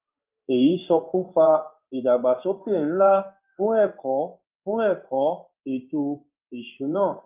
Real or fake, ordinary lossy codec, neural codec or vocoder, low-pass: fake; Opus, 24 kbps; codec, 16 kHz in and 24 kHz out, 1 kbps, XY-Tokenizer; 3.6 kHz